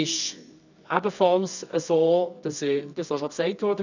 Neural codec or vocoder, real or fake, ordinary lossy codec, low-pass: codec, 24 kHz, 0.9 kbps, WavTokenizer, medium music audio release; fake; none; 7.2 kHz